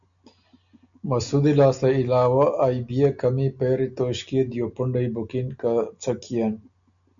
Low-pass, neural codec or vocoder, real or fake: 7.2 kHz; none; real